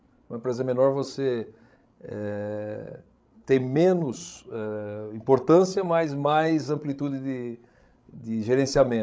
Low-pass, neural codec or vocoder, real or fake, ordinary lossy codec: none; codec, 16 kHz, 16 kbps, FreqCodec, larger model; fake; none